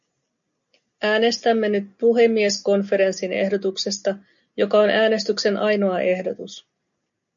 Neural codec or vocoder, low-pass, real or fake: none; 7.2 kHz; real